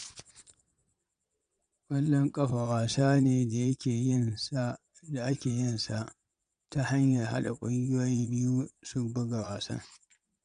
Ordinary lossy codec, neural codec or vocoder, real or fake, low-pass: none; vocoder, 22.05 kHz, 80 mel bands, Vocos; fake; 9.9 kHz